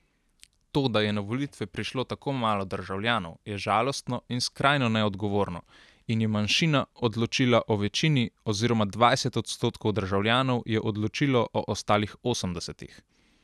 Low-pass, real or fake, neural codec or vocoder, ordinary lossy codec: none; real; none; none